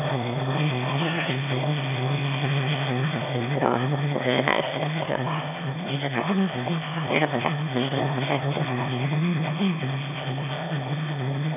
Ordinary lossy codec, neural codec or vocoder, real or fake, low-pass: none; autoencoder, 22.05 kHz, a latent of 192 numbers a frame, VITS, trained on one speaker; fake; 3.6 kHz